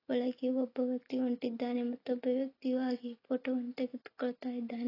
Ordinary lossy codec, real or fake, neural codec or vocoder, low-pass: AAC, 24 kbps; real; none; 5.4 kHz